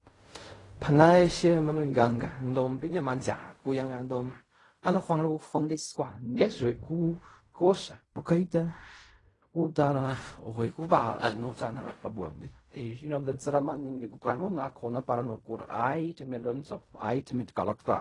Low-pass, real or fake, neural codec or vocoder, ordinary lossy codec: 10.8 kHz; fake; codec, 16 kHz in and 24 kHz out, 0.4 kbps, LongCat-Audio-Codec, fine tuned four codebook decoder; AAC, 32 kbps